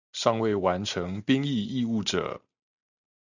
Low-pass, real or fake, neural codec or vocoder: 7.2 kHz; real; none